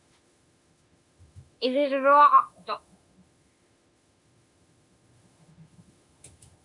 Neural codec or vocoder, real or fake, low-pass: autoencoder, 48 kHz, 32 numbers a frame, DAC-VAE, trained on Japanese speech; fake; 10.8 kHz